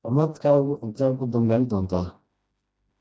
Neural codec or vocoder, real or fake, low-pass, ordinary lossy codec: codec, 16 kHz, 1 kbps, FreqCodec, smaller model; fake; none; none